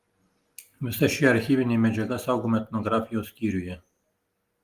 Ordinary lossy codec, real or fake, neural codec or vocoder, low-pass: Opus, 24 kbps; real; none; 14.4 kHz